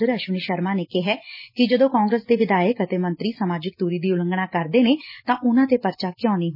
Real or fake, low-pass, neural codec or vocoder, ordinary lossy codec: real; 5.4 kHz; none; MP3, 24 kbps